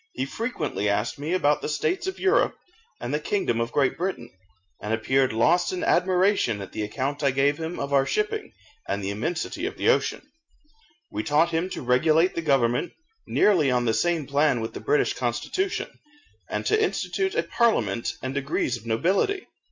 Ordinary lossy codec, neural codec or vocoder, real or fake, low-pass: MP3, 64 kbps; none; real; 7.2 kHz